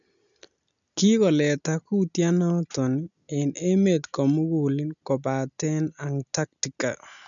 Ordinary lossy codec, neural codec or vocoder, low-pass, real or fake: none; none; 7.2 kHz; real